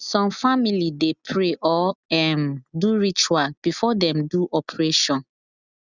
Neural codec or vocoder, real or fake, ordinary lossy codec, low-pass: none; real; none; 7.2 kHz